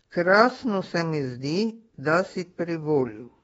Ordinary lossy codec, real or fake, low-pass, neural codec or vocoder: AAC, 24 kbps; fake; 19.8 kHz; autoencoder, 48 kHz, 32 numbers a frame, DAC-VAE, trained on Japanese speech